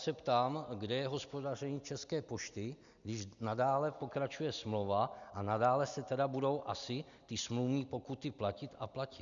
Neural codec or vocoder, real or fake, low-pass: none; real; 7.2 kHz